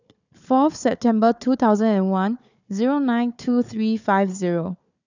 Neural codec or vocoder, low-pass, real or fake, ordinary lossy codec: codec, 16 kHz, 4 kbps, FunCodec, trained on Chinese and English, 50 frames a second; 7.2 kHz; fake; none